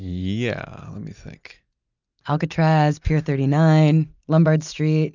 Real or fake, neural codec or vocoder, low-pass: real; none; 7.2 kHz